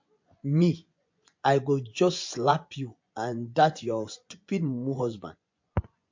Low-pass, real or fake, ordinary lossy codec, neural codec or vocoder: 7.2 kHz; fake; MP3, 48 kbps; vocoder, 24 kHz, 100 mel bands, Vocos